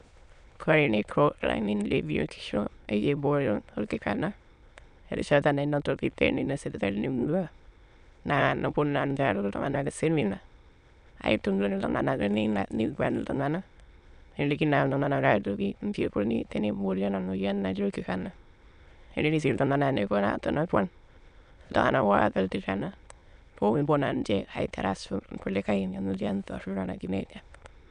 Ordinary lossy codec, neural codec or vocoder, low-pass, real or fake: none; autoencoder, 22.05 kHz, a latent of 192 numbers a frame, VITS, trained on many speakers; 9.9 kHz; fake